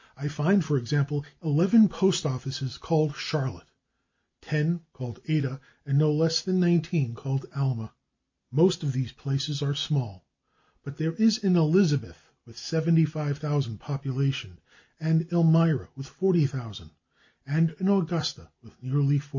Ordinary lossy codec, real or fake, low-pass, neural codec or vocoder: MP3, 32 kbps; real; 7.2 kHz; none